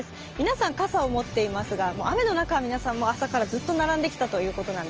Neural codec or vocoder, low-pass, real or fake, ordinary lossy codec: none; 7.2 kHz; real; Opus, 24 kbps